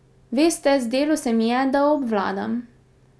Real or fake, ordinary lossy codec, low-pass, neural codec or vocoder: real; none; none; none